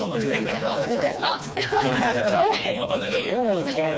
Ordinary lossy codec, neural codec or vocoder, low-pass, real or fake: none; codec, 16 kHz, 2 kbps, FreqCodec, smaller model; none; fake